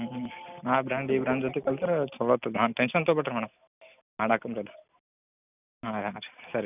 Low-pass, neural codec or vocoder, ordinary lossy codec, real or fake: 3.6 kHz; none; none; real